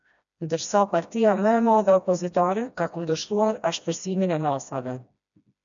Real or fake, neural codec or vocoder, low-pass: fake; codec, 16 kHz, 1 kbps, FreqCodec, smaller model; 7.2 kHz